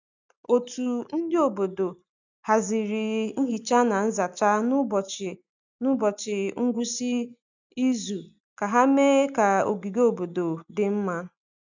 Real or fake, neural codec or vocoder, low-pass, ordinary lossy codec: real; none; 7.2 kHz; none